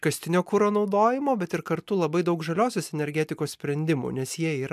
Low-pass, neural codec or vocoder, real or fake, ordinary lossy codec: 14.4 kHz; none; real; MP3, 96 kbps